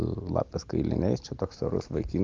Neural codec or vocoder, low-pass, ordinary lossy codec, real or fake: none; 7.2 kHz; Opus, 32 kbps; real